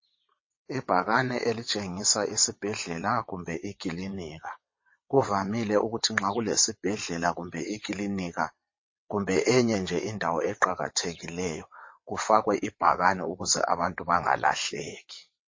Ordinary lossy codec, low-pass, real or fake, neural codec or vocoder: MP3, 32 kbps; 7.2 kHz; fake; vocoder, 22.05 kHz, 80 mel bands, WaveNeXt